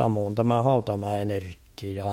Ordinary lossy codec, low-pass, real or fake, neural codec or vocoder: MP3, 64 kbps; 19.8 kHz; fake; autoencoder, 48 kHz, 32 numbers a frame, DAC-VAE, trained on Japanese speech